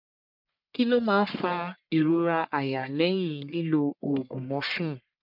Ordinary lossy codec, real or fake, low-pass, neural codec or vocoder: none; fake; 5.4 kHz; codec, 44.1 kHz, 1.7 kbps, Pupu-Codec